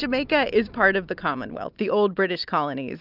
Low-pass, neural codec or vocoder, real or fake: 5.4 kHz; none; real